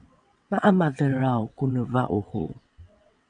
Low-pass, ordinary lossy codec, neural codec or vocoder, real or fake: 9.9 kHz; AAC, 64 kbps; vocoder, 22.05 kHz, 80 mel bands, WaveNeXt; fake